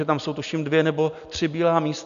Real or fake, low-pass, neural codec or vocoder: real; 7.2 kHz; none